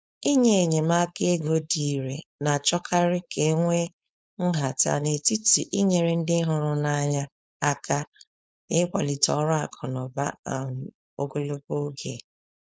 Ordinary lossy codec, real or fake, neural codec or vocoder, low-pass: none; fake; codec, 16 kHz, 4.8 kbps, FACodec; none